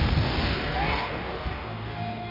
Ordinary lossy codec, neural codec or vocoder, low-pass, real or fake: none; codec, 44.1 kHz, 2.6 kbps, DAC; 5.4 kHz; fake